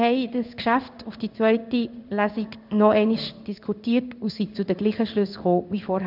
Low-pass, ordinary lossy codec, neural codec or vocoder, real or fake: 5.4 kHz; none; codec, 16 kHz in and 24 kHz out, 1 kbps, XY-Tokenizer; fake